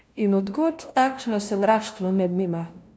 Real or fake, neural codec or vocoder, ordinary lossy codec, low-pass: fake; codec, 16 kHz, 0.5 kbps, FunCodec, trained on LibriTTS, 25 frames a second; none; none